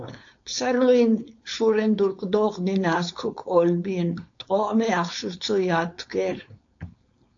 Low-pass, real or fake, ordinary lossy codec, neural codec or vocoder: 7.2 kHz; fake; AAC, 48 kbps; codec, 16 kHz, 4.8 kbps, FACodec